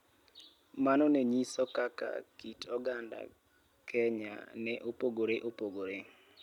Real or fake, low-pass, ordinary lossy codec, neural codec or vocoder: real; 19.8 kHz; none; none